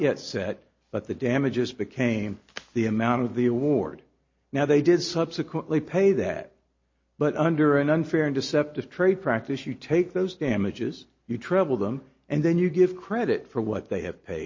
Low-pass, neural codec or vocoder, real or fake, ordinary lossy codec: 7.2 kHz; none; real; MP3, 32 kbps